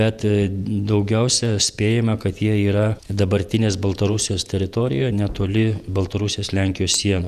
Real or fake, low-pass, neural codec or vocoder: fake; 14.4 kHz; vocoder, 48 kHz, 128 mel bands, Vocos